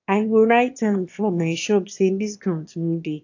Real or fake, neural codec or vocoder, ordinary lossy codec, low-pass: fake; autoencoder, 22.05 kHz, a latent of 192 numbers a frame, VITS, trained on one speaker; AAC, 48 kbps; 7.2 kHz